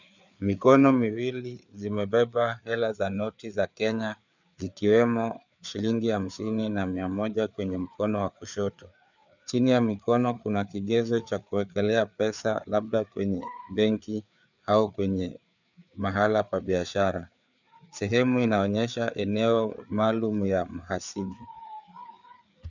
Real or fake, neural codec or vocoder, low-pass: fake; codec, 16 kHz, 4 kbps, FreqCodec, larger model; 7.2 kHz